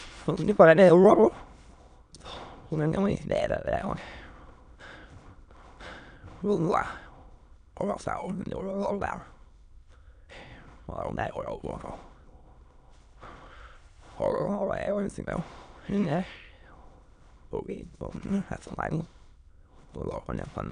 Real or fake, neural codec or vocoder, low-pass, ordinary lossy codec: fake; autoencoder, 22.05 kHz, a latent of 192 numbers a frame, VITS, trained on many speakers; 9.9 kHz; none